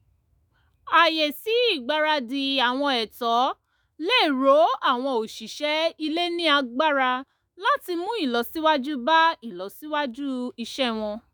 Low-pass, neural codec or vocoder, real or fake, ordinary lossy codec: none; autoencoder, 48 kHz, 128 numbers a frame, DAC-VAE, trained on Japanese speech; fake; none